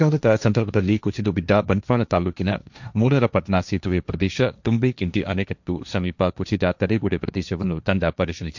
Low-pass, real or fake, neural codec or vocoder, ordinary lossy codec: 7.2 kHz; fake; codec, 16 kHz, 1.1 kbps, Voila-Tokenizer; none